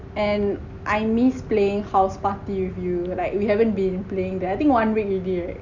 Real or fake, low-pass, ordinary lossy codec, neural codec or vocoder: real; 7.2 kHz; none; none